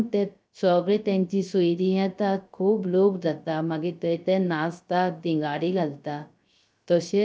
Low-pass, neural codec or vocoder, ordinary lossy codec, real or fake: none; codec, 16 kHz, 0.3 kbps, FocalCodec; none; fake